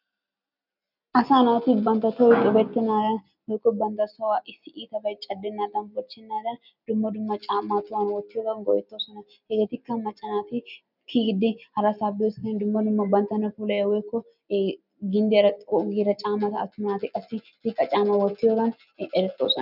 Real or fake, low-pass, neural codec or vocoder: real; 5.4 kHz; none